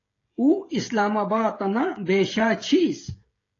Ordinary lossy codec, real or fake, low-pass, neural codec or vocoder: AAC, 32 kbps; fake; 7.2 kHz; codec, 16 kHz, 16 kbps, FreqCodec, smaller model